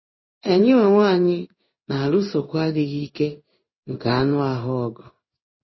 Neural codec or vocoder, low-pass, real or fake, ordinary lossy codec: codec, 16 kHz in and 24 kHz out, 1 kbps, XY-Tokenizer; 7.2 kHz; fake; MP3, 24 kbps